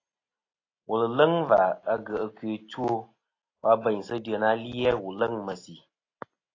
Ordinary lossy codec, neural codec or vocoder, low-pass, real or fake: AAC, 32 kbps; none; 7.2 kHz; real